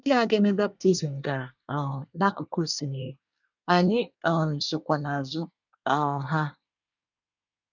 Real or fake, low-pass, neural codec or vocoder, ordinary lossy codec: fake; 7.2 kHz; codec, 24 kHz, 1 kbps, SNAC; none